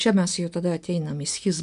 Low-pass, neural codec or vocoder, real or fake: 10.8 kHz; none; real